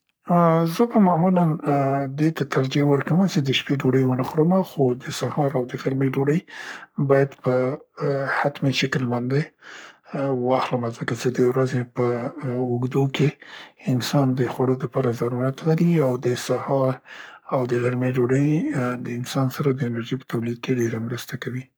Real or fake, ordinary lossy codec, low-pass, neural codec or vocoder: fake; none; none; codec, 44.1 kHz, 3.4 kbps, Pupu-Codec